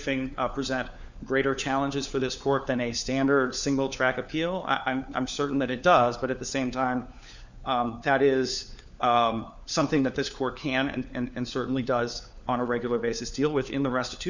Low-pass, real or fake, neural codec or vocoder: 7.2 kHz; fake; codec, 16 kHz, 4 kbps, FunCodec, trained on LibriTTS, 50 frames a second